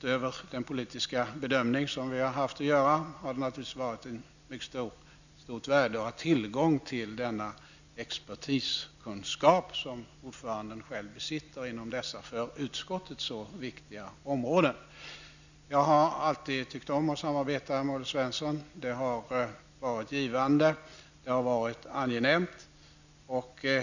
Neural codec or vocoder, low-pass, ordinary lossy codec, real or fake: none; 7.2 kHz; none; real